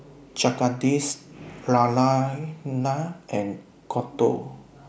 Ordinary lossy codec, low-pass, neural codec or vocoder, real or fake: none; none; none; real